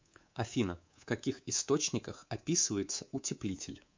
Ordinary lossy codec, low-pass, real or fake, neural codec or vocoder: MP3, 64 kbps; 7.2 kHz; fake; codec, 24 kHz, 3.1 kbps, DualCodec